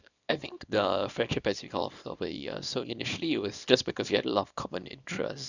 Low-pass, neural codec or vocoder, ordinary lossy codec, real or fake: 7.2 kHz; codec, 24 kHz, 0.9 kbps, WavTokenizer, small release; none; fake